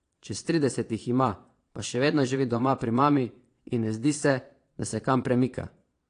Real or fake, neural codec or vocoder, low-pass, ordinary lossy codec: fake; vocoder, 22.05 kHz, 80 mel bands, Vocos; 9.9 kHz; AAC, 48 kbps